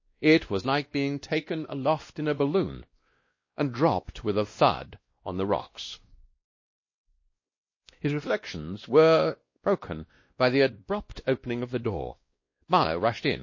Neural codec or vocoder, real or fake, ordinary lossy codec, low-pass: codec, 16 kHz, 1 kbps, X-Codec, WavLM features, trained on Multilingual LibriSpeech; fake; MP3, 32 kbps; 7.2 kHz